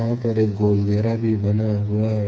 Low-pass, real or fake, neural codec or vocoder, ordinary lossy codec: none; fake; codec, 16 kHz, 4 kbps, FreqCodec, smaller model; none